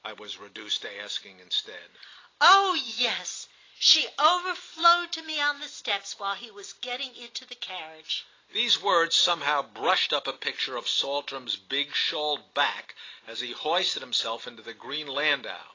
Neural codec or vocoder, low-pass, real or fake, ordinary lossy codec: none; 7.2 kHz; real; AAC, 32 kbps